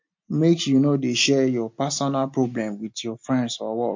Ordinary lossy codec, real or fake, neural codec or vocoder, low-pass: MP3, 48 kbps; real; none; 7.2 kHz